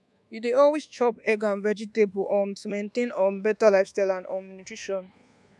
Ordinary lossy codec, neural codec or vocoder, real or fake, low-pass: none; codec, 24 kHz, 1.2 kbps, DualCodec; fake; none